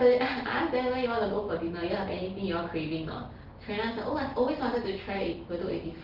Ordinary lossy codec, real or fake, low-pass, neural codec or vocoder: Opus, 16 kbps; real; 5.4 kHz; none